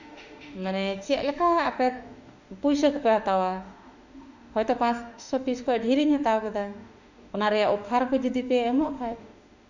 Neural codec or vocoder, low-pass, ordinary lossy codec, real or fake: autoencoder, 48 kHz, 32 numbers a frame, DAC-VAE, trained on Japanese speech; 7.2 kHz; none; fake